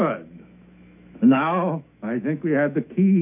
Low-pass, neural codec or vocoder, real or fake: 3.6 kHz; autoencoder, 48 kHz, 128 numbers a frame, DAC-VAE, trained on Japanese speech; fake